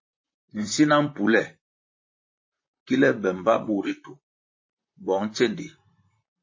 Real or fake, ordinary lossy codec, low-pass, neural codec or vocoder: fake; MP3, 32 kbps; 7.2 kHz; vocoder, 44.1 kHz, 128 mel bands, Pupu-Vocoder